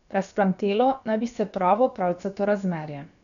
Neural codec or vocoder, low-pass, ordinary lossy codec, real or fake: codec, 16 kHz, about 1 kbps, DyCAST, with the encoder's durations; 7.2 kHz; none; fake